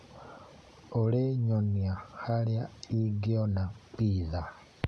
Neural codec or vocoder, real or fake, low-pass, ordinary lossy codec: none; real; none; none